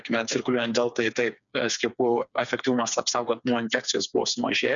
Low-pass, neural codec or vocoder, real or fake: 7.2 kHz; codec, 16 kHz, 4 kbps, FreqCodec, smaller model; fake